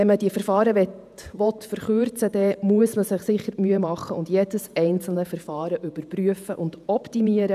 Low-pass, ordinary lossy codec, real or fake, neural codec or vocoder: 14.4 kHz; none; fake; vocoder, 48 kHz, 128 mel bands, Vocos